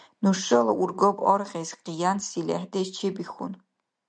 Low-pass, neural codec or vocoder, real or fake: 9.9 kHz; none; real